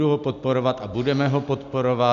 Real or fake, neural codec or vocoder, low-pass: real; none; 7.2 kHz